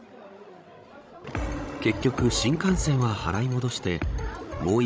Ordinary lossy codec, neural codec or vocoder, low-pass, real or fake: none; codec, 16 kHz, 16 kbps, FreqCodec, larger model; none; fake